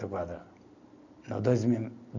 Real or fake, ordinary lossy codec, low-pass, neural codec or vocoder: real; none; 7.2 kHz; none